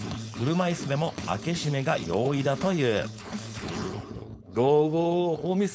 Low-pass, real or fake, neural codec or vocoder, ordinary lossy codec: none; fake; codec, 16 kHz, 4.8 kbps, FACodec; none